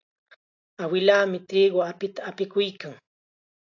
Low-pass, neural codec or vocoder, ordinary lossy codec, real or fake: 7.2 kHz; none; AAC, 48 kbps; real